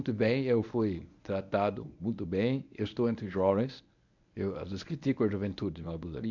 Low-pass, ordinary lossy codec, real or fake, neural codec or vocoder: 7.2 kHz; none; fake; codec, 24 kHz, 0.9 kbps, WavTokenizer, medium speech release version 1